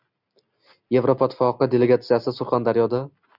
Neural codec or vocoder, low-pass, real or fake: none; 5.4 kHz; real